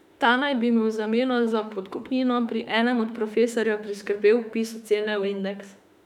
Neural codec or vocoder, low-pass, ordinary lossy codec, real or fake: autoencoder, 48 kHz, 32 numbers a frame, DAC-VAE, trained on Japanese speech; 19.8 kHz; none; fake